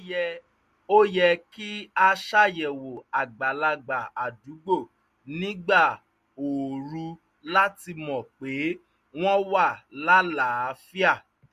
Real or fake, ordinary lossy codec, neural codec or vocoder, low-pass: real; MP3, 64 kbps; none; 14.4 kHz